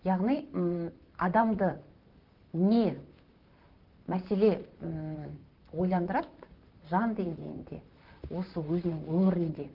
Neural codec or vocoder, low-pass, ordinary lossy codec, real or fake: vocoder, 44.1 kHz, 128 mel bands, Pupu-Vocoder; 5.4 kHz; Opus, 32 kbps; fake